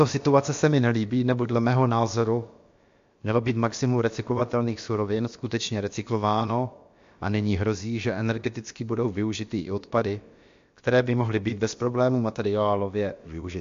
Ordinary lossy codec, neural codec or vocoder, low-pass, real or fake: MP3, 48 kbps; codec, 16 kHz, about 1 kbps, DyCAST, with the encoder's durations; 7.2 kHz; fake